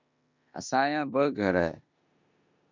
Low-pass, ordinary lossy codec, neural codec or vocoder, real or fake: 7.2 kHz; MP3, 64 kbps; codec, 16 kHz in and 24 kHz out, 0.9 kbps, LongCat-Audio-Codec, four codebook decoder; fake